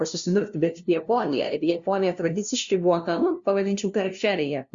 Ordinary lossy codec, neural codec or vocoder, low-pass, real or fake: Opus, 64 kbps; codec, 16 kHz, 0.5 kbps, FunCodec, trained on LibriTTS, 25 frames a second; 7.2 kHz; fake